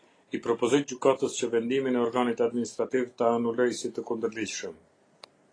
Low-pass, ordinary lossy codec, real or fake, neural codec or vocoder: 9.9 kHz; AAC, 32 kbps; real; none